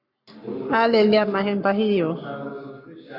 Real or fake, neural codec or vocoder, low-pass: fake; codec, 44.1 kHz, 7.8 kbps, Pupu-Codec; 5.4 kHz